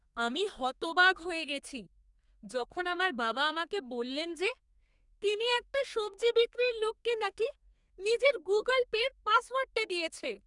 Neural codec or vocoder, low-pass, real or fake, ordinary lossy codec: codec, 32 kHz, 1.9 kbps, SNAC; 10.8 kHz; fake; none